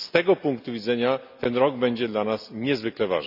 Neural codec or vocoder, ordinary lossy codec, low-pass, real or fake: none; none; 5.4 kHz; real